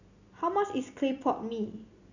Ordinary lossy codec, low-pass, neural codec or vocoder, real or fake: none; 7.2 kHz; none; real